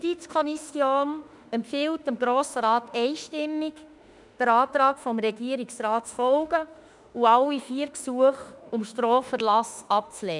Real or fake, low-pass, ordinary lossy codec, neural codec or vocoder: fake; 10.8 kHz; none; autoencoder, 48 kHz, 32 numbers a frame, DAC-VAE, trained on Japanese speech